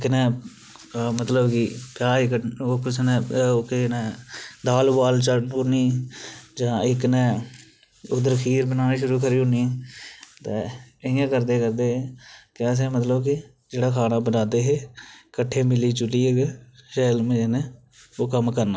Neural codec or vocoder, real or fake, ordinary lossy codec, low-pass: none; real; none; none